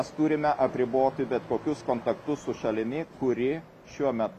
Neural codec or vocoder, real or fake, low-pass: none; real; 14.4 kHz